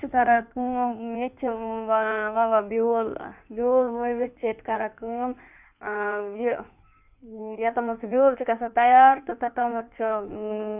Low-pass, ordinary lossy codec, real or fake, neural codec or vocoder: 3.6 kHz; none; fake; codec, 16 kHz in and 24 kHz out, 1.1 kbps, FireRedTTS-2 codec